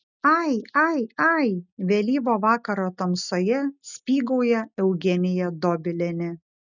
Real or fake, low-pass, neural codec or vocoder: real; 7.2 kHz; none